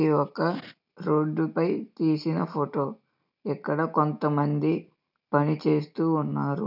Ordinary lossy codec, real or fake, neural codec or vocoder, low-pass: none; real; none; 5.4 kHz